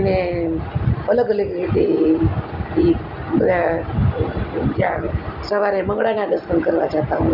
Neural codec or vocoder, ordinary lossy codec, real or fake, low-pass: none; none; real; 5.4 kHz